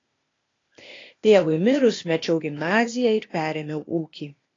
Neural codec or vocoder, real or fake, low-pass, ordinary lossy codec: codec, 16 kHz, 0.8 kbps, ZipCodec; fake; 7.2 kHz; AAC, 32 kbps